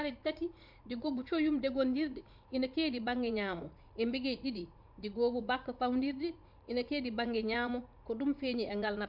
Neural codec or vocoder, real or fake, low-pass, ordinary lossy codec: vocoder, 44.1 kHz, 80 mel bands, Vocos; fake; 5.4 kHz; MP3, 48 kbps